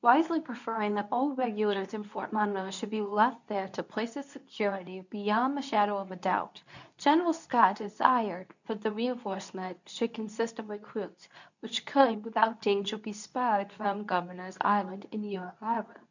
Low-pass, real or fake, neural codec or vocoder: 7.2 kHz; fake; codec, 24 kHz, 0.9 kbps, WavTokenizer, medium speech release version 2